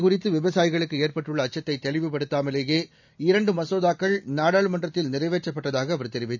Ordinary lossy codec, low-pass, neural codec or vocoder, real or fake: none; 7.2 kHz; none; real